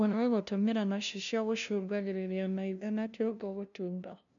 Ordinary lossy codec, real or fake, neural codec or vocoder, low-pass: none; fake; codec, 16 kHz, 0.5 kbps, FunCodec, trained on LibriTTS, 25 frames a second; 7.2 kHz